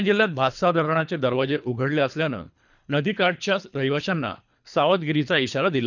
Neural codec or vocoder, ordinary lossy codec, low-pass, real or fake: codec, 24 kHz, 3 kbps, HILCodec; none; 7.2 kHz; fake